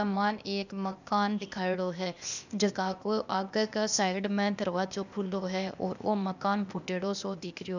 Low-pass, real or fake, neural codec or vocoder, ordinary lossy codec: 7.2 kHz; fake; codec, 16 kHz, 0.8 kbps, ZipCodec; none